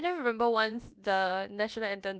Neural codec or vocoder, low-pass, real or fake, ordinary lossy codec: codec, 16 kHz, about 1 kbps, DyCAST, with the encoder's durations; none; fake; none